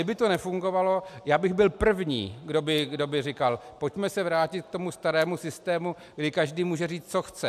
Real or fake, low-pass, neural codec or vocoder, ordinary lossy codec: real; 14.4 kHz; none; AAC, 96 kbps